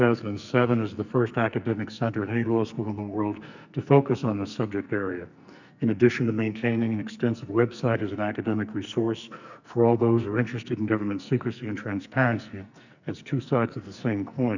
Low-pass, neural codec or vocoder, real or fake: 7.2 kHz; codec, 44.1 kHz, 2.6 kbps, SNAC; fake